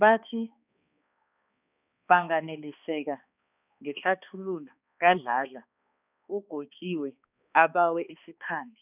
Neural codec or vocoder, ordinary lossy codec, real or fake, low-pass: codec, 16 kHz, 2 kbps, X-Codec, HuBERT features, trained on balanced general audio; none; fake; 3.6 kHz